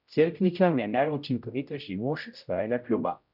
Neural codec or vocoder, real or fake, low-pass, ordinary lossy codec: codec, 16 kHz, 0.5 kbps, X-Codec, HuBERT features, trained on general audio; fake; 5.4 kHz; none